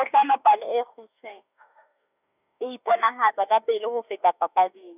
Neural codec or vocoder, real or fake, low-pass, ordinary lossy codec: autoencoder, 48 kHz, 32 numbers a frame, DAC-VAE, trained on Japanese speech; fake; 3.6 kHz; none